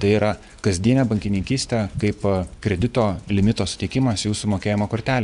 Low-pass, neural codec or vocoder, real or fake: 10.8 kHz; vocoder, 24 kHz, 100 mel bands, Vocos; fake